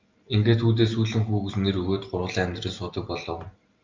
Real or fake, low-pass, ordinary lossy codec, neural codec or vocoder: real; 7.2 kHz; Opus, 24 kbps; none